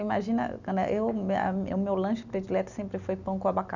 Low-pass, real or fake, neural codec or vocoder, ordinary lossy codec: 7.2 kHz; real; none; none